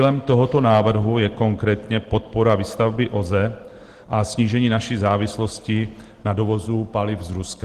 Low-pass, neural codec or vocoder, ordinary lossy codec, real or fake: 14.4 kHz; none; Opus, 16 kbps; real